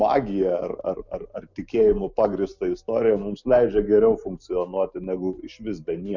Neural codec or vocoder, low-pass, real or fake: none; 7.2 kHz; real